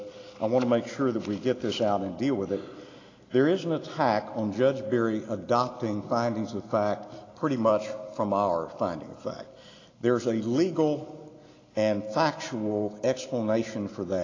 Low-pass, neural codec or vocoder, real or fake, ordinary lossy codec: 7.2 kHz; none; real; AAC, 32 kbps